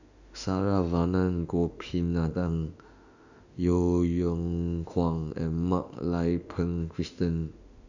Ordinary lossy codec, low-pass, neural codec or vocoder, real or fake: none; 7.2 kHz; autoencoder, 48 kHz, 32 numbers a frame, DAC-VAE, trained on Japanese speech; fake